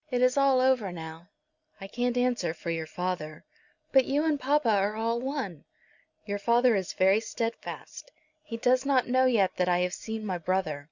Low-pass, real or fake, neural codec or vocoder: 7.2 kHz; real; none